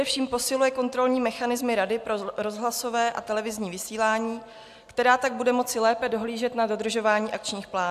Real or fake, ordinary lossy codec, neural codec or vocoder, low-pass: real; AAC, 96 kbps; none; 14.4 kHz